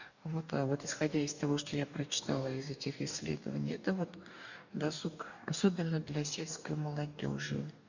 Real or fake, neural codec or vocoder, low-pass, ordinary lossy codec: fake; codec, 44.1 kHz, 2.6 kbps, DAC; 7.2 kHz; none